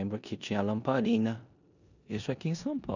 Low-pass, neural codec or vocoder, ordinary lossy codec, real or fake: 7.2 kHz; codec, 16 kHz in and 24 kHz out, 0.9 kbps, LongCat-Audio-Codec, four codebook decoder; none; fake